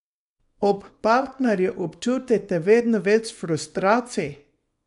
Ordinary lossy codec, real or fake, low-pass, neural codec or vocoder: MP3, 96 kbps; fake; 10.8 kHz; codec, 24 kHz, 0.9 kbps, WavTokenizer, small release